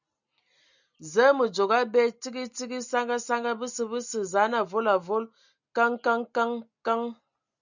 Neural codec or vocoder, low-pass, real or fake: none; 7.2 kHz; real